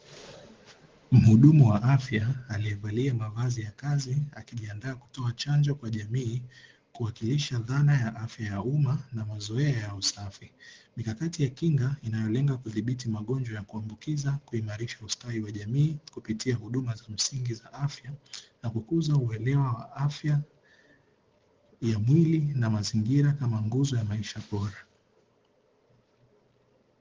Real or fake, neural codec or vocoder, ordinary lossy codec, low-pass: real; none; Opus, 16 kbps; 7.2 kHz